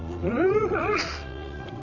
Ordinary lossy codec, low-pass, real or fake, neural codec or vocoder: none; 7.2 kHz; fake; vocoder, 22.05 kHz, 80 mel bands, Vocos